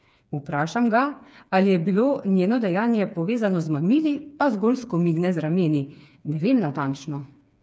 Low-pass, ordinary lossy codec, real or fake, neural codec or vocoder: none; none; fake; codec, 16 kHz, 4 kbps, FreqCodec, smaller model